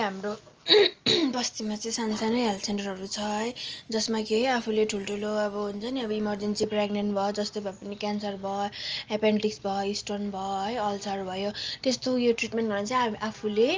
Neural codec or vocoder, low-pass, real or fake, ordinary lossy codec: none; 7.2 kHz; real; Opus, 24 kbps